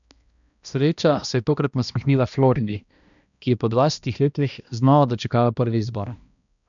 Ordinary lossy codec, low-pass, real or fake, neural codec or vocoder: none; 7.2 kHz; fake; codec, 16 kHz, 1 kbps, X-Codec, HuBERT features, trained on balanced general audio